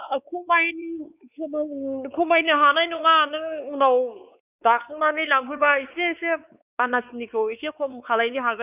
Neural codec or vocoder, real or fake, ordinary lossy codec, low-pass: codec, 16 kHz, 4 kbps, X-Codec, WavLM features, trained on Multilingual LibriSpeech; fake; none; 3.6 kHz